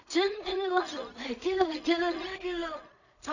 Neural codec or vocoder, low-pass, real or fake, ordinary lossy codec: codec, 16 kHz in and 24 kHz out, 0.4 kbps, LongCat-Audio-Codec, two codebook decoder; 7.2 kHz; fake; none